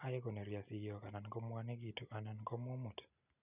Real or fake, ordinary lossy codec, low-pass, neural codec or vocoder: real; none; 3.6 kHz; none